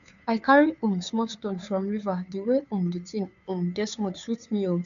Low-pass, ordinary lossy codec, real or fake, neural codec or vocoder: 7.2 kHz; none; fake; codec, 16 kHz, 2 kbps, FunCodec, trained on Chinese and English, 25 frames a second